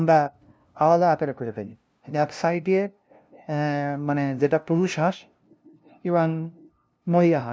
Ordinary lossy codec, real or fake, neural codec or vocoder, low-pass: none; fake; codec, 16 kHz, 0.5 kbps, FunCodec, trained on LibriTTS, 25 frames a second; none